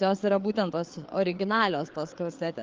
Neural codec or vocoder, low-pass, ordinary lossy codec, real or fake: codec, 16 kHz, 4 kbps, FreqCodec, larger model; 7.2 kHz; Opus, 32 kbps; fake